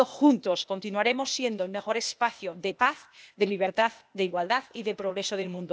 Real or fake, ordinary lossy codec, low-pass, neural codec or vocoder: fake; none; none; codec, 16 kHz, 0.8 kbps, ZipCodec